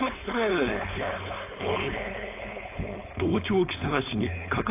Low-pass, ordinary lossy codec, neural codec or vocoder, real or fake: 3.6 kHz; AAC, 24 kbps; codec, 16 kHz, 4.8 kbps, FACodec; fake